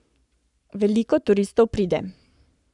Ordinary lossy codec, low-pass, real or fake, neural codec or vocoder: none; 10.8 kHz; fake; codec, 44.1 kHz, 7.8 kbps, Pupu-Codec